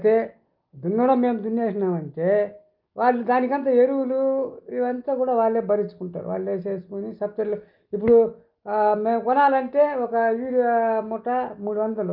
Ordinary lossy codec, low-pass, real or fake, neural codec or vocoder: Opus, 24 kbps; 5.4 kHz; real; none